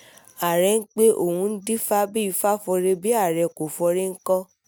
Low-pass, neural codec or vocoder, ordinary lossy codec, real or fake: none; none; none; real